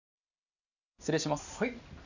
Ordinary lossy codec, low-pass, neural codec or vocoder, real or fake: none; 7.2 kHz; none; real